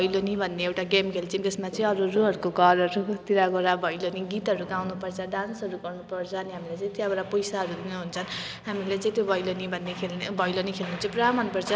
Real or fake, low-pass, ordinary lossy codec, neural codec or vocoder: real; none; none; none